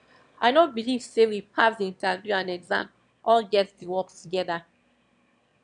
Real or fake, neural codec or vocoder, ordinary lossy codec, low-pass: fake; autoencoder, 22.05 kHz, a latent of 192 numbers a frame, VITS, trained on one speaker; MP3, 96 kbps; 9.9 kHz